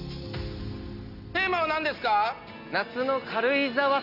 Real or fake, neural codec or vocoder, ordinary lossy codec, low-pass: real; none; none; 5.4 kHz